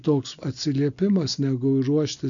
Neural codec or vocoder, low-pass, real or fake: none; 7.2 kHz; real